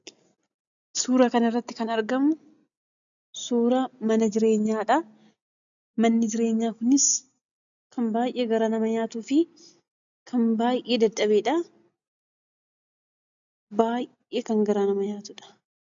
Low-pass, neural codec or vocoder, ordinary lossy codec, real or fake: 7.2 kHz; none; AAC, 64 kbps; real